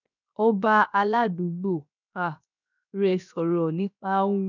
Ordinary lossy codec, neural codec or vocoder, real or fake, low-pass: none; codec, 16 kHz, 0.7 kbps, FocalCodec; fake; 7.2 kHz